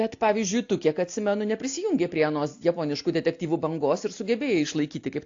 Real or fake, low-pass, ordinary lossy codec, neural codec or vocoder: real; 7.2 kHz; AAC, 48 kbps; none